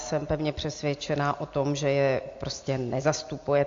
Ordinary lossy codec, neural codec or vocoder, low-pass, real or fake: AAC, 48 kbps; none; 7.2 kHz; real